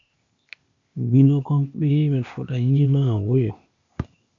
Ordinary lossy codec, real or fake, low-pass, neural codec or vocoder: AAC, 64 kbps; fake; 7.2 kHz; codec, 16 kHz, 0.8 kbps, ZipCodec